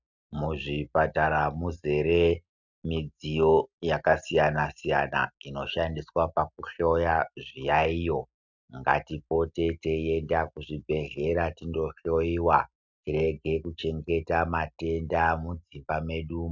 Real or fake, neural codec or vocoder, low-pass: real; none; 7.2 kHz